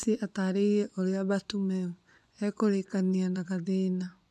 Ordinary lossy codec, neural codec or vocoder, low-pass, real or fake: none; vocoder, 24 kHz, 100 mel bands, Vocos; none; fake